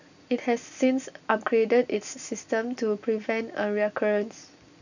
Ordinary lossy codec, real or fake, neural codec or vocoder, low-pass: none; real; none; 7.2 kHz